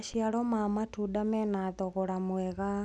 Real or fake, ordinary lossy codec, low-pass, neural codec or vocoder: real; none; none; none